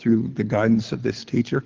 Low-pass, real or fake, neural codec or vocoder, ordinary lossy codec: 7.2 kHz; fake; codec, 24 kHz, 6 kbps, HILCodec; Opus, 16 kbps